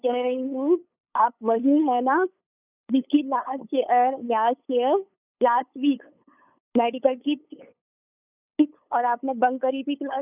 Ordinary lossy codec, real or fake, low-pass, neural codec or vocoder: none; fake; 3.6 kHz; codec, 16 kHz, 8 kbps, FunCodec, trained on LibriTTS, 25 frames a second